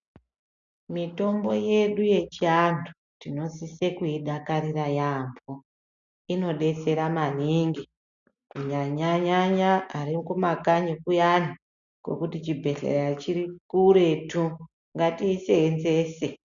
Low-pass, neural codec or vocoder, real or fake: 7.2 kHz; none; real